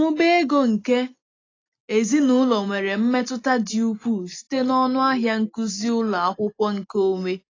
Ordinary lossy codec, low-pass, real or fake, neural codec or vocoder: AAC, 32 kbps; 7.2 kHz; real; none